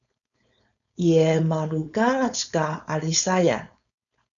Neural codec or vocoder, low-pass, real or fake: codec, 16 kHz, 4.8 kbps, FACodec; 7.2 kHz; fake